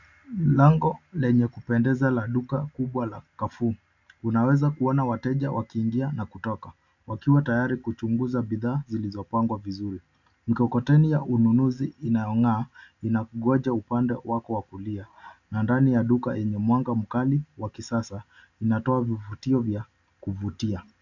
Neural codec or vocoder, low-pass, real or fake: none; 7.2 kHz; real